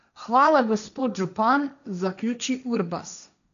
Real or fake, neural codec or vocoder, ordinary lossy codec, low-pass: fake; codec, 16 kHz, 1.1 kbps, Voila-Tokenizer; none; 7.2 kHz